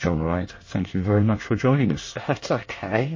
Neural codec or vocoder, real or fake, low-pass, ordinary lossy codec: codec, 24 kHz, 1 kbps, SNAC; fake; 7.2 kHz; MP3, 32 kbps